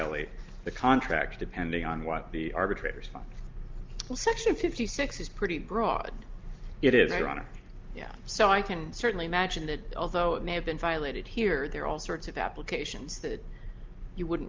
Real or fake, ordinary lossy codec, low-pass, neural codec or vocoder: real; Opus, 24 kbps; 7.2 kHz; none